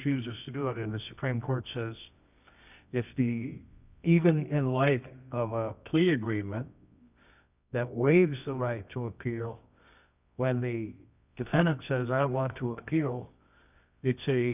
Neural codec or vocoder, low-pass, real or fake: codec, 24 kHz, 0.9 kbps, WavTokenizer, medium music audio release; 3.6 kHz; fake